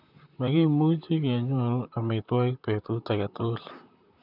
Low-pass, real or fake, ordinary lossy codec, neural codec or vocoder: 5.4 kHz; fake; none; vocoder, 44.1 kHz, 128 mel bands, Pupu-Vocoder